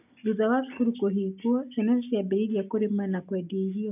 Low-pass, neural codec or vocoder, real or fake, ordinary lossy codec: 3.6 kHz; none; real; none